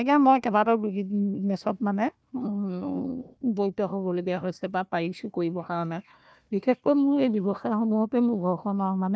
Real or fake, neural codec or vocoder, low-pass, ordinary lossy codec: fake; codec, 16 kHz, 1 kbps, FunCodec, trained on Chinese and English, 50 frames a second; none; none